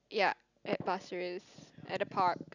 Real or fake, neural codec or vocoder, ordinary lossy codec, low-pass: real; none; none; 7.2 kHz